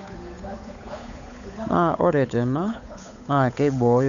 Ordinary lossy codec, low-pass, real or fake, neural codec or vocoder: none; 7.2 kHz; fake; codec, 16 kHz, 8 kbps, FunCodec, trained on Chinese and English, 25 frames a second